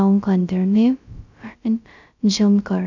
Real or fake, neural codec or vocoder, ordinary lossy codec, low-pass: fake; codec, 16 kHz, 0.2 kbps, FocalCodec; none; 7.2 kHz